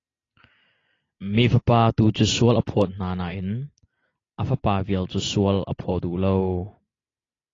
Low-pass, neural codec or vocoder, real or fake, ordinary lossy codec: 7.2 kHz; none; real; AAC, 32 kbps